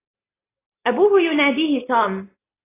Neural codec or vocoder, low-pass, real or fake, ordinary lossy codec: none; 3.6 kHz; real; AAC, 16 kbps